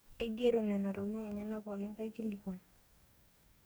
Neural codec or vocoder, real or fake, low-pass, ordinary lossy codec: codec, 44.1 kHz, 2.6 kbps, DAC; fake; none; none